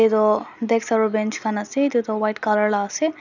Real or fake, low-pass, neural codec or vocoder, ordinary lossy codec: real; 7.2 kHz; none; none